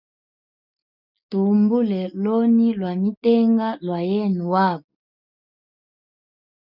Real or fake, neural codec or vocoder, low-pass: real; none; 5.4 kHz